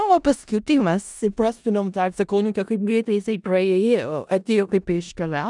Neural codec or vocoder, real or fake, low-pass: codec, 16 kHz in and 24 kHz out, 0.4 kbps, LongCat-Audio-Codec, four codebook decoder; fake; 10.8 kHz